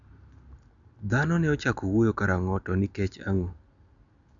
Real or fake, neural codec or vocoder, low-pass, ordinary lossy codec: real; none; 7.2 kHz; none